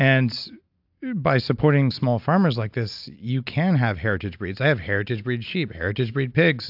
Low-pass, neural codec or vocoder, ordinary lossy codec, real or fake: 5.4 kHz; none; AAC, 48 kbps; real